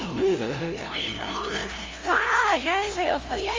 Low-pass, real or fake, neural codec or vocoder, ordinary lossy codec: 7.2 kHz; fake; codec, 16 kHz, 0.5 kbps, FunCodec, trained on LibriTTS, 25 frames a second; Opus, 32 kbps